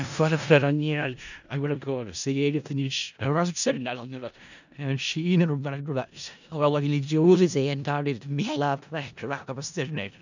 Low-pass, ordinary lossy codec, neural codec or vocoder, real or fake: 7.2 kHz; none; codec, 16 kHz in and 24 kHz out, 0.4 kbps, LongCat-Audio-Codec, four codebook decoder; fake